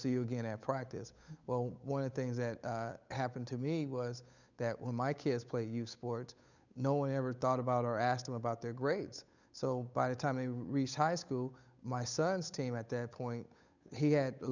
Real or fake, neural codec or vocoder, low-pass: fake; codec, 16 kHz, 8 kbps, FunCodec, trained on Chinese and English, 25 frames a second; 7.2 kHz